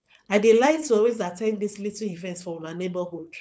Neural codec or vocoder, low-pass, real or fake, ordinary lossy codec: codec, 16 kHz, 4.8 kbps, FACodec; none; fake; none